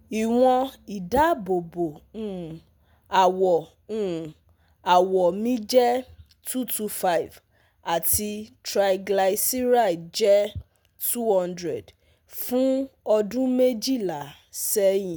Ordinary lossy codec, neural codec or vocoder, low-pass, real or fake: none; none; none; real